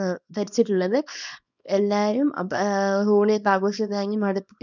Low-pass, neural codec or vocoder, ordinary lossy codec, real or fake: 7.2 kHz; codec, 24 kHz, 0.9 kbps, WavTokenizer, small release; none; fake